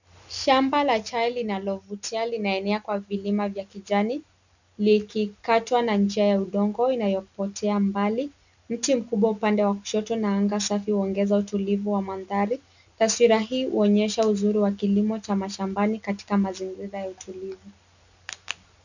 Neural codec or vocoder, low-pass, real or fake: none; 7.2 kHz; real